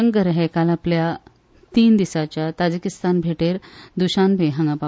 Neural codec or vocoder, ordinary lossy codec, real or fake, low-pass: none; none; real; none